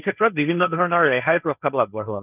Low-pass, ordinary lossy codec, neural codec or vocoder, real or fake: 3.6 kHz; none; codec, 16 kHz, 1.1 kbps, Voila-Tokenizer; fake